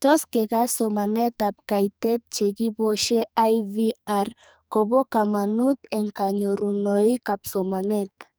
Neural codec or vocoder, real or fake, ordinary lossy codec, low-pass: codec, 44.1 kHz, 2.6 kbps, SNAC; fake; none; none